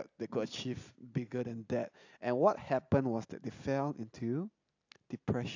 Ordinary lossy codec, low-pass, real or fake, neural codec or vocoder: AAC, 48 kbps; 7.2 kHz; real; none